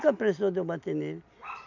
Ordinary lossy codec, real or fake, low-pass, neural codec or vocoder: none; real; 7.2 kHz; none